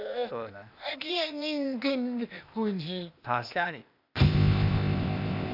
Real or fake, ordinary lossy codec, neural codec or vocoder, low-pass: fake; none; codec, 16 kHz, 0.8 kbps, ZipCodec; 5.4 kHz